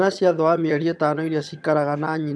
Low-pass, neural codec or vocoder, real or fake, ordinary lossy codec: none; vocoder, 22.05 kHz, 80 mel bands, HiFi-GAN; fake; none